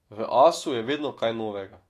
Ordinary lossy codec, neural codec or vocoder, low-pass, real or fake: AAC, 48 kbps; autoencoder, 48 kHz, 128 numbers a frame, DAC-VAE, trained on Japanese speech; 14.4 kHz; fake